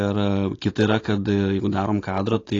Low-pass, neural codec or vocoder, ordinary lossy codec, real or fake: 7.2 kHz; none; AAC, 32 kbps; real